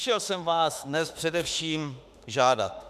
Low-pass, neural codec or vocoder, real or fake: 14.4 kHz; autoencoder, 48 kHz, 32 numbers a frame, DAC-VAE, trained on Japanese speech; fake